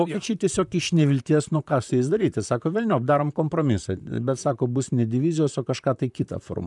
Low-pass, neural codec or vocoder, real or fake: 10.8 kHz; vocoder, 44.1 kHz, 128 mel bands, Pupu-Vocoder; fake